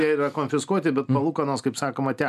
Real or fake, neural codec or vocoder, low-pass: real; none; 14.4 kHz